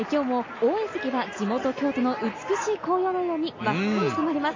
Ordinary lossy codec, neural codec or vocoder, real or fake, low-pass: AAC, 32 kbps; none; real; 7.2 kHz